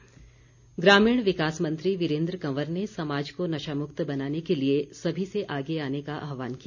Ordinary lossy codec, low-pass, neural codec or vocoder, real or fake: none; 7.2 kHz; none; real